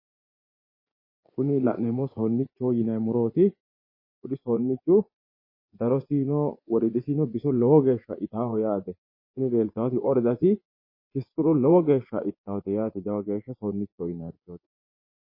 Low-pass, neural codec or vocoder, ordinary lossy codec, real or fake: 5.4 kHz; vocoder, 24 kHz, 100 mel bands, Vocos; MP3, 24 kbps; fake